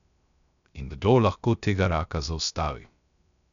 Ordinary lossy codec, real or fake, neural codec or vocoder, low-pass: none; fake; codec, 16 kHz, 0.3 kbps, FocalCodec; 7.2 kHz